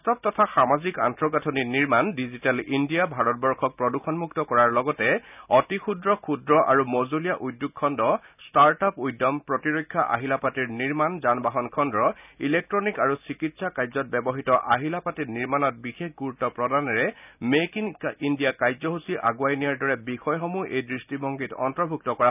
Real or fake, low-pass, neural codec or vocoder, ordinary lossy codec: real; 3.6 kHz; none; none